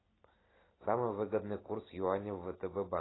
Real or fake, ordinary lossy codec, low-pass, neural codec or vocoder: real; AAC, 16 kbps; 7.2 kHz; none